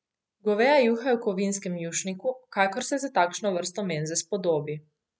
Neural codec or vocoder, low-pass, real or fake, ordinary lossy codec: none; none; real; none